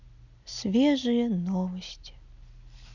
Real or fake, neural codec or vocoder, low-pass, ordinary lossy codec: real; none; 7.2 kHz; none